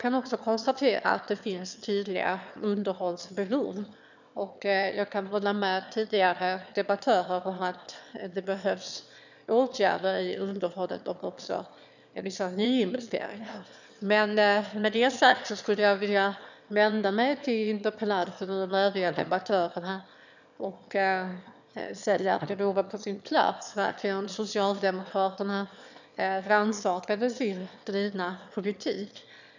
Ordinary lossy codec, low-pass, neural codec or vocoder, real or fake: none; 7.2 kHz; autoencoder, 22.05 kHz, a latent of 192 numbers a frame, VITS, trained on one speaker; fake